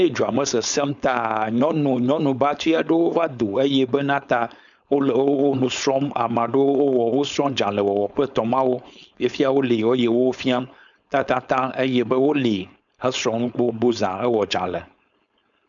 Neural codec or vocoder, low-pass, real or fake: codec, 16 kHz, 4.8 kbps, FACodec; 7.2 kHz; fake